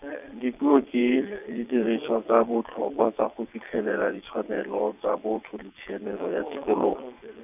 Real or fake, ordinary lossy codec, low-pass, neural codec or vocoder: fake; none; 3.6 kHz; vocoder, 22.05 kHz, 80 mel bands, WaveNeXt